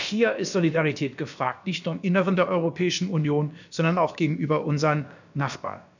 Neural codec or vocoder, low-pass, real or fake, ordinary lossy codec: codec, 16 kHz, about 1 kbps, DyCAST, with the encoder's durations; 7.2 kHz; fake; none